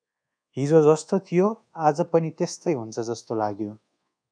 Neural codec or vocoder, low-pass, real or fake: codec, 24 kHz, 1.2 kbps, DualCodec; 9.9 kHz; fake